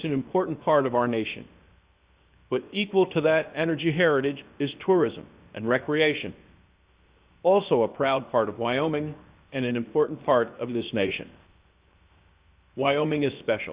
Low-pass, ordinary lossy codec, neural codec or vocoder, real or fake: 3.6 kHz; Opus, 64 kbps; codec, 16 kHz, 0.7 kbps, FocalCodec; fake